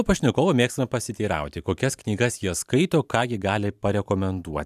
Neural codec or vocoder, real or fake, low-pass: vocoder, 44.1 kHz, 128 mel bands every 512 samples, BigVGAN v2; fake; 14.4 kHz